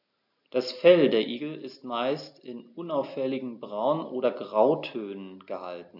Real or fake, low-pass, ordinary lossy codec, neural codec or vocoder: real; 5.4 kHz; none; none